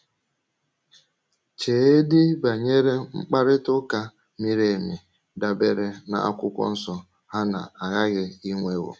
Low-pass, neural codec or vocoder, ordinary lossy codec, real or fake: none; none; none; real